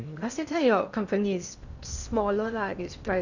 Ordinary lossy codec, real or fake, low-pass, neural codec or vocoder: none; fake; 7.2 kHz; codec, 16 kHz in and 24 kHz out, 0.8 kbps, FocalCodec, streaming, 65536 codes